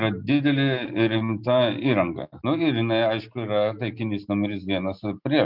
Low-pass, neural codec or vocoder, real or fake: 5.4 kHz; none; real